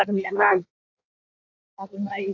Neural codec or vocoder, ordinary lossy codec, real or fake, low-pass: codec, 16 kHz in and 24 kHz out, 1.1 kbps, FireRedTTS-2 codec; AAC, 48 kbps; fake; 7.2 kHz